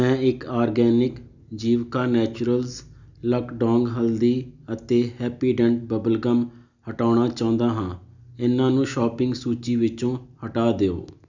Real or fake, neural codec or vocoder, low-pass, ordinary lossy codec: real; none; 7.2 kHz; none